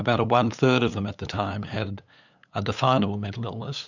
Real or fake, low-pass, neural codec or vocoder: fake; 7.2 kHz; codec, 16 kHz, 8 kbps, FunCodec, trained on LibriTTS, 25 frames a second